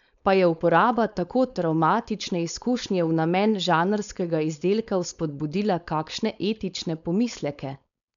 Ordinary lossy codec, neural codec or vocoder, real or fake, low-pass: none; codec, 16 kHz, 4.8 kbps, FACodec; fake; 7.2 kHz